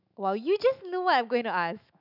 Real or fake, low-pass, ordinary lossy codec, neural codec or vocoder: fake; 5.4 kHz; none; autoencoder, 48 kHz, 128 numbers a frame, DAC-VAE, trained on Japanese speech